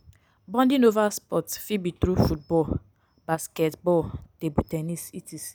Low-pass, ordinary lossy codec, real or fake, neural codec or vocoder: none; none; real; none